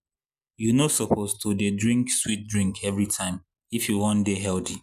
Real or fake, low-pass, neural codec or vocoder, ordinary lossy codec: real; 14.4 kHz; none; none